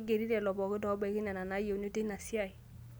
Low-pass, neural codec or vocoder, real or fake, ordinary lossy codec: none; none; real; none